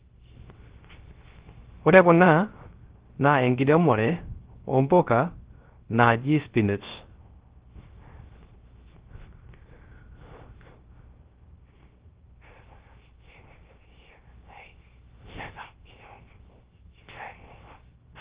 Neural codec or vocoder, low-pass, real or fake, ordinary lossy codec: codec, 16 kHz, 0.3 kbps, FocalCodec; 3.6 kHz; fake; Opus, 16 kbps